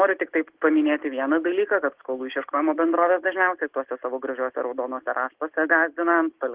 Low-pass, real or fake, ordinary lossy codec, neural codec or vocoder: 3.6 kHz; real; Opus, 16 kbps; none